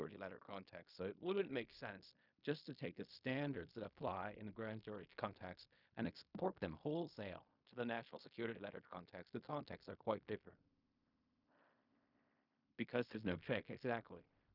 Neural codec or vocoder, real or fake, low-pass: codec, 16 kHz in and 24 kHz out, 0.4 kbps, LongCat-Audio-Codec, fine tuned four codebook decoder; fake; 5.4 kHz